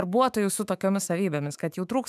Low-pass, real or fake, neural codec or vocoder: 14.4 kHz; fake; autoencoder, 48 kHz, 128 numbers a frame, DAC-VAE, trained on Japanese speech